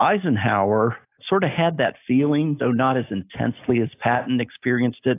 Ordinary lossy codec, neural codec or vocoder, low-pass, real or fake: AAC, 24 kbps; none; 3.6 kHz; real